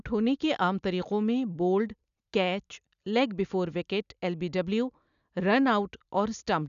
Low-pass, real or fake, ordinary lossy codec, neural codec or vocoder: 7.2 kHz; real; none; none